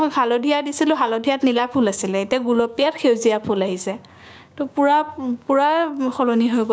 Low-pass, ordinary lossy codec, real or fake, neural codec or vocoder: none; none; fake; codec, 16 kHz, 6 kbps, DAC